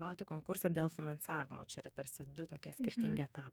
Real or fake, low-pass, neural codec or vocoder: fake; 19.8 kHz; codec, 44.1 kHz, 2.6 kbps, DAC